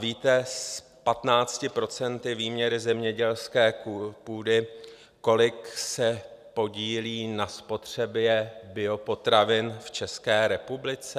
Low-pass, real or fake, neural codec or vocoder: 14.4 kHz; real; none